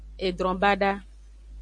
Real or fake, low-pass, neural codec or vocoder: real; 9.9 kHz; none